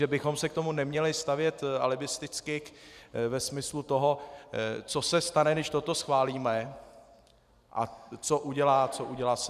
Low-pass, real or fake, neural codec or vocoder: 14.4 kHz; fake; vocoder, 44.1 kHz, 128 mel bands every 512 samples, BigVGAN v2